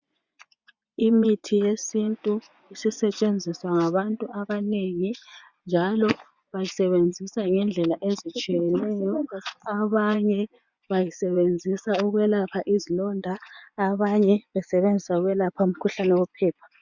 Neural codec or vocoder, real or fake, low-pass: vocoder, 24 kHz, 100 mel bands, Vocos; fake; 7.2 kHz